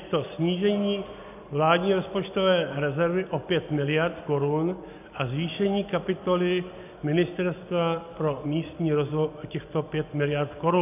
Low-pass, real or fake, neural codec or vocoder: 3.6 kHz; real; none